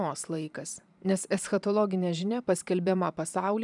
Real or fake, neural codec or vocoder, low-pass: fake; vocoder, 44.1 kHz, 128 mel bands every 512 samples, BigVGAN v2; 10.8 kHz